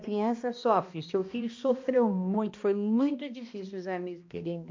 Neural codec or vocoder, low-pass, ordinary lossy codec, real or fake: codec, 16 kHz, 1 kbps, X-Codec, HuBERT features, trained on balanced general audio; 7.2 kHz; MP3, 48 kbps; fake